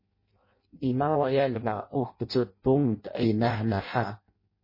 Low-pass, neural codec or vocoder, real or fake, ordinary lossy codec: 5.4 kHz; codec, 16 kHz in and 24 kHz out, 0.6 kbps, FireRedTTS-2 codec; fake; MP3, 24 kbps